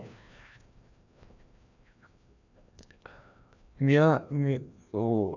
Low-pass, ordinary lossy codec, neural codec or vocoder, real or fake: 7.2 kHz; none; codec, 16 kHz, 1 kbps, FreqCodec, larger model; fake